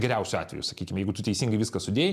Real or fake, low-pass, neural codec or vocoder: real; 14.4 kHz; none